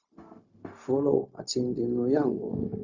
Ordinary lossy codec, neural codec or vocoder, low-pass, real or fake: Opus, 64 kbps; codec, 16 kHz, 0.4 kbps, LongCat-Audio-Codec; 7.2 kHz; fake